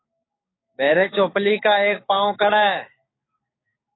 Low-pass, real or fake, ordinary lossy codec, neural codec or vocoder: 7.2 kHz; fake; AAC, 16 kbps; codec, 16 kHz, 6 kbps, DAC